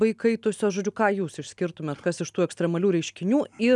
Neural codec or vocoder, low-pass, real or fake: none; 10.8 kHz; real